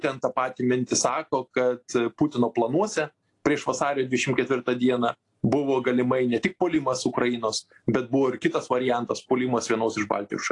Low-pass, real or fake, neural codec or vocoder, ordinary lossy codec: 10.8 kHz; real; none; AAC, 48 kbps